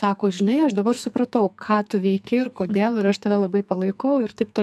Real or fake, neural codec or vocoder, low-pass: fake; codec, 44.1 kHz, 2.6 kbps, SNAC; 14.4 kHz